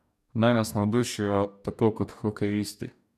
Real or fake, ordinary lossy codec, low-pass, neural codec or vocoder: fake; none; 14.4 kHz; codec, 44.1 kHz, 2.6 kbps, DAC